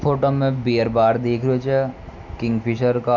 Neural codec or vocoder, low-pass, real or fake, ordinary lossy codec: none; 7.2 kHz; real; none